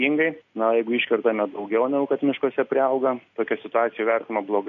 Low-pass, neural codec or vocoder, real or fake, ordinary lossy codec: 7.2 kHz; none; real; MP3, 48 kbps